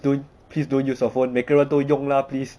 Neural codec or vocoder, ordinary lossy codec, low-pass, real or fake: none; none; none; real